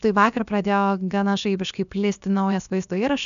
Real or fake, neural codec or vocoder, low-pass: fake; codec, 16 kHz, about 1 kbps, DyCAST, with the encoder's durations; 7.2 kHz